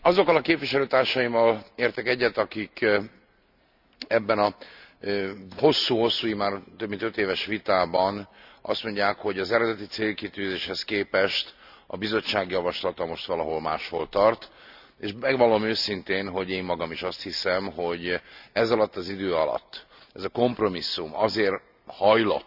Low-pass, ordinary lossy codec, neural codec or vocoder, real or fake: 5.4 kHz; none; none; real